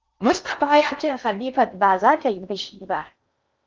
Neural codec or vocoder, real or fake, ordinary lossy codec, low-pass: codec, 16 kHz in and 24 kHz out, 0.8 kbps, FocalCodec, streaming, 65536 codes; fake; Opus, 32 kbps; 7.2 kHz